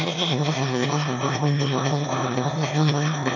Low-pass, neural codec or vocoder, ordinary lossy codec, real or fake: 7.2 kHz; autoencoder, 22.05 kHz, a latent of 192 numbers a frame, VITS, trained on one speaker; none; fake